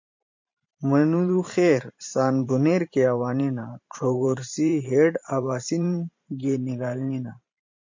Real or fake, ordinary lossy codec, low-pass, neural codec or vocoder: fake; MP3, 48 kbps; 7.2 kHz; vocoder, 44.1 kHz, 128 mel bands every 512 samples, BigVGAN v2